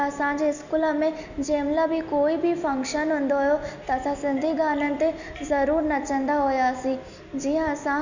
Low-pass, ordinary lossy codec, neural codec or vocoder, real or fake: 7.2 kHz; none; none; real